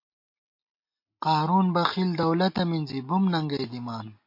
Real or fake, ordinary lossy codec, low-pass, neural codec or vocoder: real; MP3, 32 kbps; 5.4 kHz; none